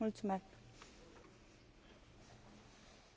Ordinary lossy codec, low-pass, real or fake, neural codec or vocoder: none; none; real; none